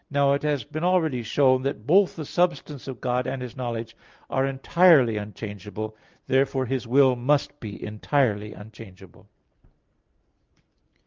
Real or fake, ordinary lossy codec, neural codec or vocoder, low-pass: real; Opus, 24 kbps; none; 7.2 kHz